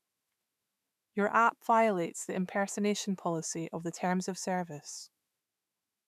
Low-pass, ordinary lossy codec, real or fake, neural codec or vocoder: 14.4 kHz; none; fake; autoencoder, 48 kHz, 128 numbers a frame, DAC-VAE, trained on Japanese speech